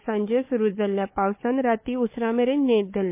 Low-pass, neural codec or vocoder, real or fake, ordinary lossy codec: 3.6 kHz; codec, 16 kHz, 4 kbps, FunCodec, trained on Chinese and English, 50 frames a second; fake; MP3, 24 kbps